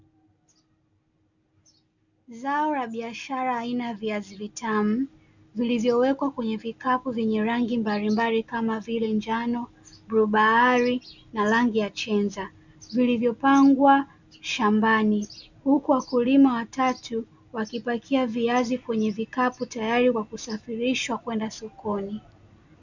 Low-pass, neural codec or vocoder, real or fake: 7.2 kHz; none; real